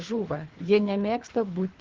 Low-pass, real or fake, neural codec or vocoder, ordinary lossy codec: 7.2 kHz; fake; codec, 24 kHz, 3 kbps, HILCodec; Opus, 16 kbps